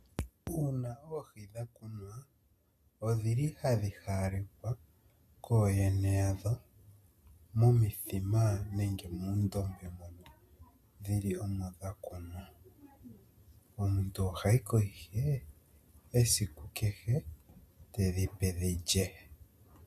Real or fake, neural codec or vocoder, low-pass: real; none; 14.4 kHz